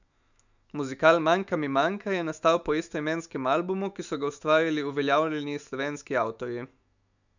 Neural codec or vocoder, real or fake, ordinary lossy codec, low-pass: autoencoder, 48 kHz, 128 numbers a frame, DAC-VAE, trained on Japanese speech; fake; none; 7.2 kHz